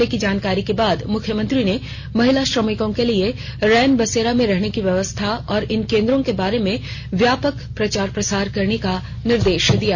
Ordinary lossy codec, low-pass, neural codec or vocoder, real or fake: none; 7.2 kHz; none; real